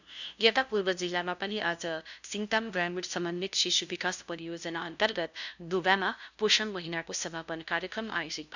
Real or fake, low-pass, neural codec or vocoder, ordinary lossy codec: fake; 7.2 kHz; codec, 16 kHz, 0.5 kbps, FunCodec, trained on LibriTTS, 25 frames a second; none